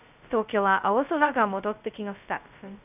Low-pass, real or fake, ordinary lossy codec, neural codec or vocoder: 3.6 kHz; fake; none; codec, 16 kHz, 0.2 kbps, FocalCodec